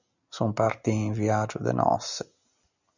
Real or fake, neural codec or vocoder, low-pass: real; none; 7.2 kHz